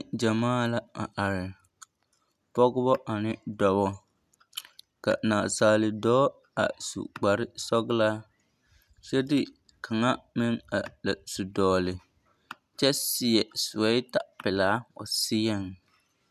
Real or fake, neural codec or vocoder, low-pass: real; none; 14.4 kHz